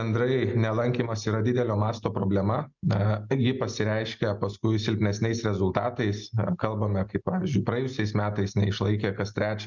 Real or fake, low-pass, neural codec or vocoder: real; 7.2 kHz; none